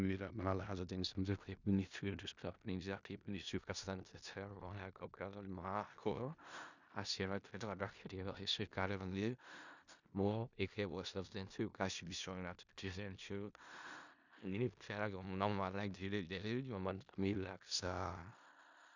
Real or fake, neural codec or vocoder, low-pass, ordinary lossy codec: fake; codec, 16 kHz in and 24 kHz out, 0.4 kbps, LongCat-Audio-Codec, four codebook decoder; 7.2 kHz; none